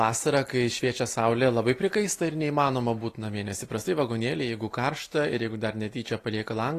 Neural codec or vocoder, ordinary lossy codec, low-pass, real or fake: none; AAC, 48 kbps; 14.4 kHz; real